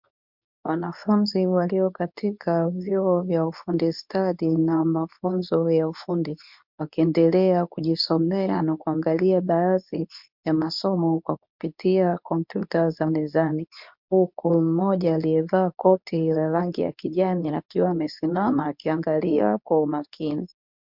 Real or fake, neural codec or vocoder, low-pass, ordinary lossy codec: fake; codec, 24 kHz, 0.9 kbps, WavTokenizer, medium speech release version 2; 5.4 kHz; MP3, 48 kbps